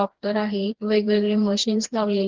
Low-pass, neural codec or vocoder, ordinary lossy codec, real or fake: 7.2 kHz; codec, 16 kHz, 2 kbps, FreqCodec, smaller model; Opus, 16 kbps; fake